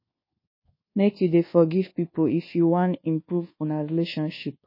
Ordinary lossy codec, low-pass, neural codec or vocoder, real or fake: MP3, 24 kbps; 5.4 kHz; codec, 24 kHz, 1.2 kbps, DualCodec; fake